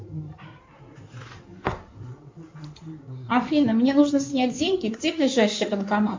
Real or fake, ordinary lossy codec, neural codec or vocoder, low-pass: fake; MP3, 48 kbps; codec, 16 kHz in and 24 kHz out, 2.2 kbps, FireRedTTS-2 codec; 7.2 kHz